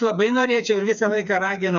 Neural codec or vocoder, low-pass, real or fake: codec, 16 kHz, 4 kbps, FreqCodec, smaller model; 7.2 kHz; fake